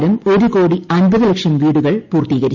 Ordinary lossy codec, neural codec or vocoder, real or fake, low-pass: none; none; real; 7.2 kHz